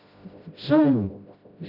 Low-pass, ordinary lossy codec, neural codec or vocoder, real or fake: 5.4 kHz; none; codec, 16 kHz, 0.5 kbps, FreqCodec, smaller model; fake